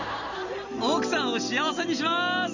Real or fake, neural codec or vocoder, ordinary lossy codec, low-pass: real; none; none; 7.2 kHz